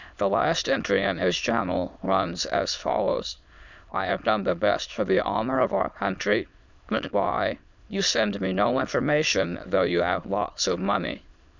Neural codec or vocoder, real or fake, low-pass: autoencoder, 22.05 kHz, a latent of 192 numbers a frame, VITS, trained on many speakers; fake; 7.2 kHz